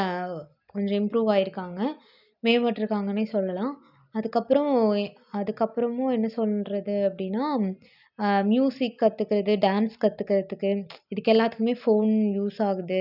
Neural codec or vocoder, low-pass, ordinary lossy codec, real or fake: none; 5.4 kHz; none; real